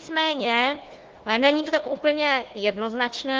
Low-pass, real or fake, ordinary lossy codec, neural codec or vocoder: 7.2 kHz; fake; Opus, 16 kbps; codec, 16 kHz, 1 kbps, FunCodec, trained on Chinese and English, 50 frames a second